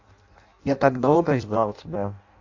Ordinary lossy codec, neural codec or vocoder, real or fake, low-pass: AAC, 48 kbps; codec, 16 kHz in and 24 kHz out, 0.6 kbps, FireRedTTS-2 codec; fake; 7.2 kHz